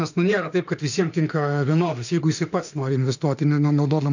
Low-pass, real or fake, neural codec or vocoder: 7.2 kHz; fake; autoencoder, 48 kHz, 32 numbers a frame, DAC-VAE, trained on Japanese speech